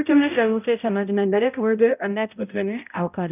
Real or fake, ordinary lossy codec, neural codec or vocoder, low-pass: fake; none; codec, 16 kHz, 0.5 kbps, X-Codec, HuBERT features, trained on balanced general audio; 3.6 kHz